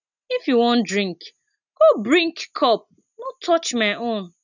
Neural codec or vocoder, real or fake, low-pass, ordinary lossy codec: none; real; 7.2 kHz; none